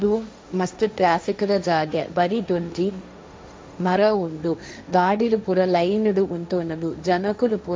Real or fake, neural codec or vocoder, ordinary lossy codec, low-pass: fake; codec, 16 kHz, 1.1 kbps, Voila-Tokenizer; none; none